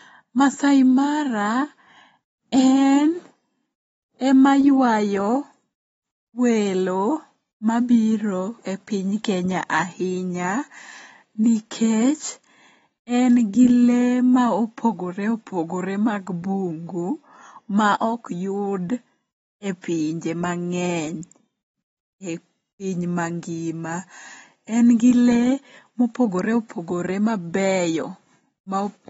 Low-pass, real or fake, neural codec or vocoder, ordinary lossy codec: 19.8 kHz; real; none; AAC, 24 kbps